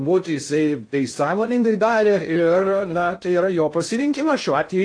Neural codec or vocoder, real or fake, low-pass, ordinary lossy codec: codec, 16 kHz in and 24 kHz out, 0.6 kbps, FocalCodec, streaming, 2048 codes; fake; 9.9 kHz; AAC, 48 kbps